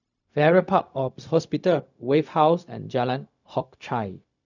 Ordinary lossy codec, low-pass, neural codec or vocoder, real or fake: none; 7.2 kHz; codec, 16 kHz, 0.4 kbps, LongCat-Audio-Codec; fake